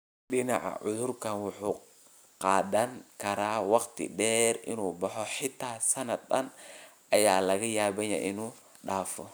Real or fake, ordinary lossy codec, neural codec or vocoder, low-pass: real; none; none; none